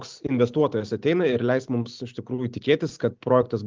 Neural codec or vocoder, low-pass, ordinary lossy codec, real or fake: none; 7.2 kHz; Opus, 32 kbps; real